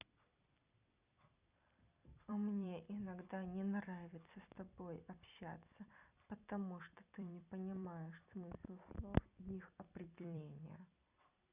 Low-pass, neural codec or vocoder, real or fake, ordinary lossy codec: 3.6 kHz; vocoder, 44.1 kHz, 128 mel bands every 256 samples, BigVGAN v2; fake; Opus, 64 kbps